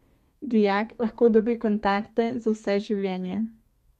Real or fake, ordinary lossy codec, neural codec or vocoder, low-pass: fake; MP3, 64 kbps; codec, 32 kHz, 1.9 kbps, SNAC; 14.4 kHz